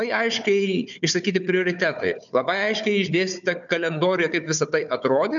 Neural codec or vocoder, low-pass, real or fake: codec, 16 kHz, 4 kbps, FunCodec, trained on Chinese and English, 50 frames a second; 7.2 kHz; fake